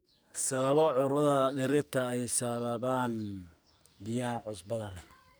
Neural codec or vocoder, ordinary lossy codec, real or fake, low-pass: codec, 44.1 kHz, 2.6 kbps, SNAC; none; fake; none